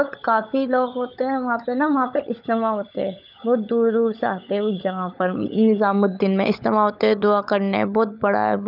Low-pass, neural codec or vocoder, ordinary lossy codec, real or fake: 5.4 kHz; codec, 16 kHz, 16 kbps, FunCodec, trained on LibriTTS, 50 frames a second; none; fake